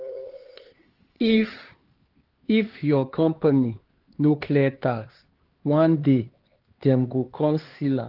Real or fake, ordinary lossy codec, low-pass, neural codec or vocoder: fake; Opus, 16 kbps; 5.4 kHz; codec, 16 kHz, 4 kbps, X-Codec, HuBERT features, trained on LibriSpeech